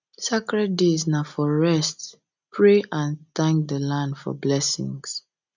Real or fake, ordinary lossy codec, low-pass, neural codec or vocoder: real; none; 7.2 kHz; none